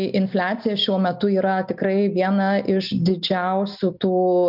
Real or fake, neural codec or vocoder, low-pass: real; none; 5.4 kHz